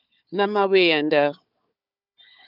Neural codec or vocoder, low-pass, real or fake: codec, 16 kHz, 4 kbps, FunCodec, trained on Chinese and English, 50 frames a second; 5.4 kHz; fake